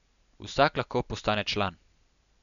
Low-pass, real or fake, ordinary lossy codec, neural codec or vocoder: 7.2 kHz; real; none; none